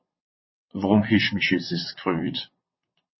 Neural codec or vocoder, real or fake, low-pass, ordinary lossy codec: vocoder, 22.05 kHz, 80 mel bands, WaveNeXt; fake; 7.2 kHz; MP3, 24 kbps